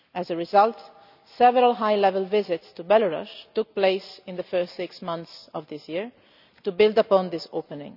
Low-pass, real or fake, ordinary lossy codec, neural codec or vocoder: 5.4 kHz; real; none; none